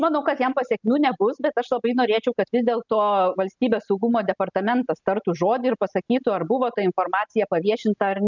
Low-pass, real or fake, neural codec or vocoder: 7.2 kHz; real; none